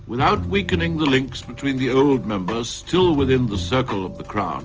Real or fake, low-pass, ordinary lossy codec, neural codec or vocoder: real; 7.2 kHz; Opus, 24 kbps; none